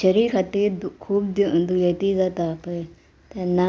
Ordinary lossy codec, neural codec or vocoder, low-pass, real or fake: none; none; none; real